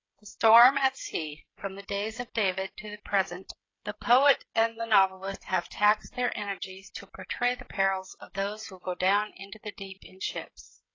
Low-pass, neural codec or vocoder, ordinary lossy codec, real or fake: 7.2 kHz; codec, 16 kHz, 16 kbps, FreqCodec, smaller model; AAC, 32 kbps; fake